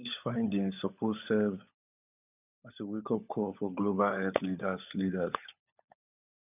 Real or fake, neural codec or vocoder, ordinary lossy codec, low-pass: fake; codec, 16 kHz, 16 kbps, FunCodec, trained on LibriTTS, 50 frames a second; none; 3.6 kHz